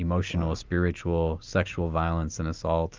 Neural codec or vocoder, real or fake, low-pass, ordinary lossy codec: none; real; 7.2 kHz; Opus, 24 kbps